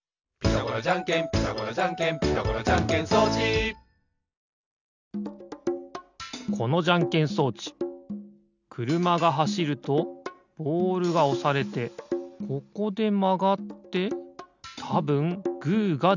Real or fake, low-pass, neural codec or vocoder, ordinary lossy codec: real; 7.2 kHz; none; none